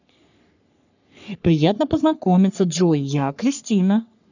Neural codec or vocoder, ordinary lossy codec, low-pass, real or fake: codec, 44.1 kHz, 3.4 kbps, Pupu-Codec; none; 7.2 kHz; fake